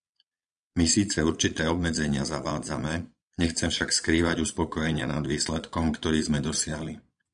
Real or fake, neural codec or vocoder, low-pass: fake; vocoder, 22.05 kHz, 80 mel bands, Vocos; 9.9 kHz